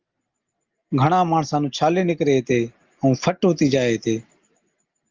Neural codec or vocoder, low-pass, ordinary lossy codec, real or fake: none; 7.2 kHz; Opus, 32 kbps; real